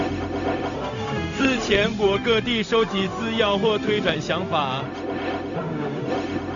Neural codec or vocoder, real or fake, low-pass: codec, 16 kHz, 0.4 kbps, LongCat-Audio-Codec; fake; 7.2 kHz